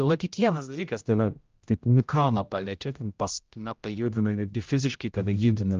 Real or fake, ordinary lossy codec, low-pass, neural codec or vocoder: fake; Opus, 24 kbps; 7.2 kHz; codec, 16 kHz, 0.5 kbps, X-Codec, HuBERT features, trained on general audio